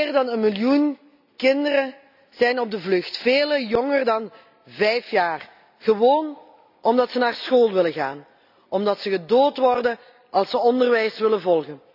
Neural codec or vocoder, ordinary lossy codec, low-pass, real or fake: none; none; 5.4 kHz; real